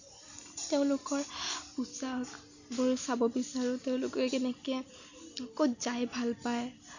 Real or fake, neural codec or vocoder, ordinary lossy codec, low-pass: real; none; none; 7.2 kHz